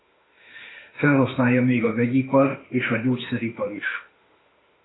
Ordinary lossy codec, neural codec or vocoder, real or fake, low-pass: AAC, 16 kbps; autoencoder, 48 kHz, 32 numbers a frame, DAC-VAE, trained on Japanese speech; fake; 7.2 kHz